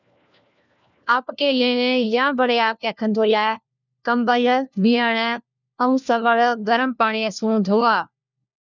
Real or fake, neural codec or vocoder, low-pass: fake; codec, 16 kHz, 1 kbps, FunCodec, trained on LibriTTS, 50 frames a second; 7.2 kHz